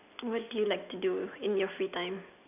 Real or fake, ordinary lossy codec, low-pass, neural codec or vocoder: real; none; 3.6 kHz; none